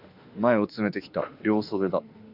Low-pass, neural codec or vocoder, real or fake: 5.4 kHz; autoencoder, 48 kHz, 32 numbers a frame, DAC-VAE, trained on Japanese speech; fake